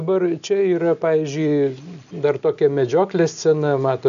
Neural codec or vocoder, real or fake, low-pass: none; real; 7.2 kHz